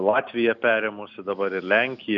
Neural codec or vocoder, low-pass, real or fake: none; 7.2 kHz; real